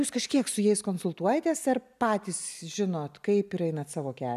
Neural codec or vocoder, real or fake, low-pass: none; real; 14.4 kHz